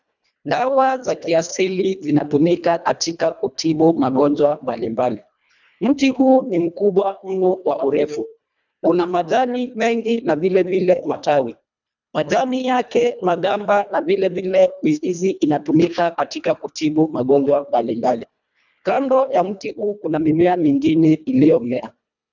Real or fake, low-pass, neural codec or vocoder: fake; 7.2 kHz; codec, 24 kHz, 1.5 kbps, HILCodec